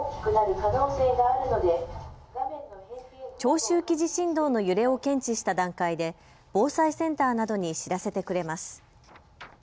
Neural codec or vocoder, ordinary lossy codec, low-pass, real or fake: none; none; none; real